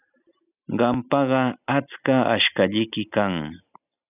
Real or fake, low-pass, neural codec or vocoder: real; 3.6 kHz; none